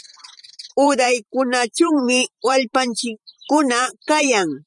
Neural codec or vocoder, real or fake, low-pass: vocoder, 44.1 kHz, 128 mel bands every 512 samples, BigVGAN v2; fake; 10.8 kHz